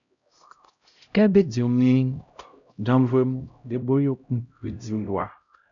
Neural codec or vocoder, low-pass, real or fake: codec, 16 kHz, 0.5 kbps, X-Codec, HuBERT features, trained on LibriSpeech; 7.2 kHz; fake